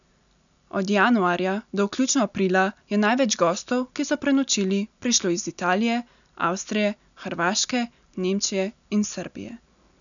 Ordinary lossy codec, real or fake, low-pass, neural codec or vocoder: none; real; 7.2 kHz; none